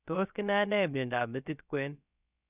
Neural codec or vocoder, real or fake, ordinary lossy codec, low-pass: codec, 16 kHz, about 1 kbps, DyCAST, with the encoder's durations; fake; none; 3.6 kHz